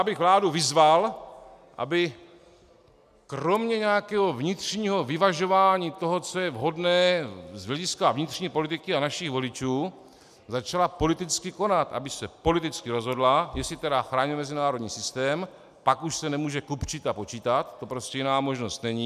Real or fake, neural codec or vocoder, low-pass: real; none; 14.4 kHz